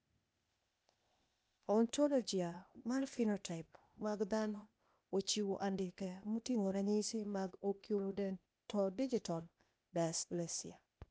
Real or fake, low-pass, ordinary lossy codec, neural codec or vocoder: fake; none; none; codec, 16 kHz, 0.8 kbps, ZipCodec